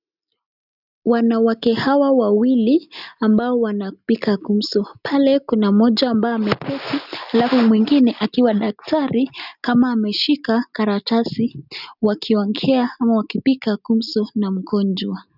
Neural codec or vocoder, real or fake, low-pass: none; real; 5.4 kHz